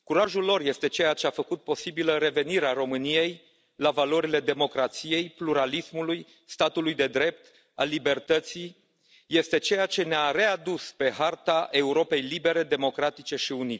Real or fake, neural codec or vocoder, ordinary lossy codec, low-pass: real; none; none; none